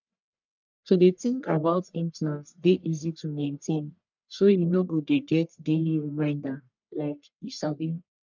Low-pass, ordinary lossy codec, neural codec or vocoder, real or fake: 7.2 kHz; none; codec, 44.1 kHz, 1.7 kbps, Pupu-Codec; fake